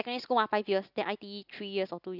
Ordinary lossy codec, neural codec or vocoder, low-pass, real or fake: none; none; 5.4 kHz; real